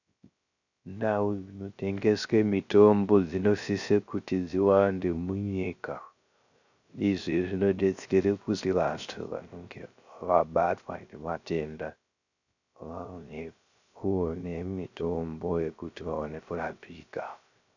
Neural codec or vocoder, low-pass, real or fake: codec, 16 kHz, 0.3 kbps, FocalCodec; 7.2 kHz; fake